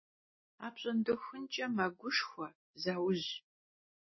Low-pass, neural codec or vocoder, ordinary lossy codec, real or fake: 7.2 kHz; none; MP3, 24 kbps; real